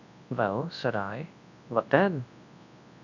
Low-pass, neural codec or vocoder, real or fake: 7.2 kHz; codec, 24 kHz, 0.9 kbps, WavTokenizer, large speech release; fake